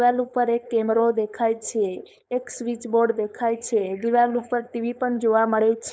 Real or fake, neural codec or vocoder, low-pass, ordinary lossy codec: fake; codec, 16 kHz, 4.8 kbps, FACodec; none; none